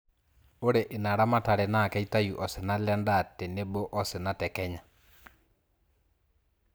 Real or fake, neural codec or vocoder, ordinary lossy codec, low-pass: real; none; none; none